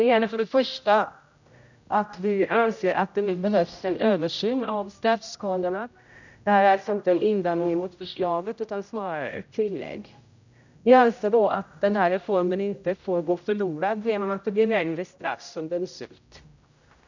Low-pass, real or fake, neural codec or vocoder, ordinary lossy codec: 7.2 kHz; fake; codec, 16 kHz, 0.5 kbps, X-Codec, HuBERT features, trained on general audio; none